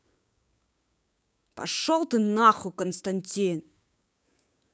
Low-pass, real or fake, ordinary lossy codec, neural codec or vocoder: none; fake; none; codec, 16 kHz, 6 kbps, DAC